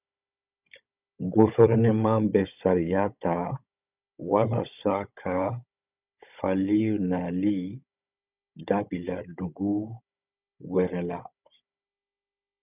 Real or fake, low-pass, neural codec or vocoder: fake; 3.6 kHz; codec, 16 kHz, 16 kbps, FunCodec, trained on Chinese and English, 50 frames a second